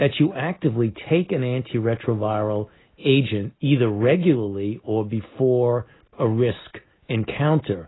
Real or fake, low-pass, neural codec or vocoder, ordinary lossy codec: real; 7.2 kHz; none; AAC, 16 kbps